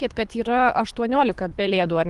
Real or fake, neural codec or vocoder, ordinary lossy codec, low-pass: fake; codec, 24 kHz, 3 kbps, HILCodec; Opus, 24 kbps; 10.8 kHz